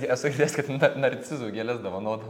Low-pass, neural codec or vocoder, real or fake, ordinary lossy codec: 19.8 kHz; none; real; MP3, 96 kbps